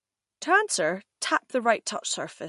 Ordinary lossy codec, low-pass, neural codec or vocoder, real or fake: MP3, 48 kbps; 14.4 kHz; none; real